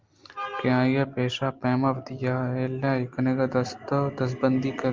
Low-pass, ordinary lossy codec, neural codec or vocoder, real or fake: 7.2 kHz; Opus, 32 kbps; none; real